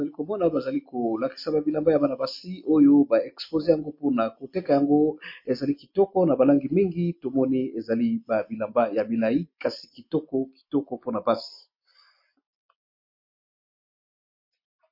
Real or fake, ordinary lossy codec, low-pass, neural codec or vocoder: real; MP3, 32 kbps; 5.4 kHz; none